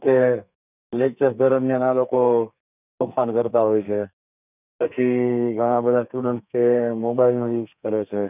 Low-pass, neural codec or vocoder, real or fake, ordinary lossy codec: 3.6 kHz; codec, 32 kHz, 1.9 kbps, SNAC; fake; none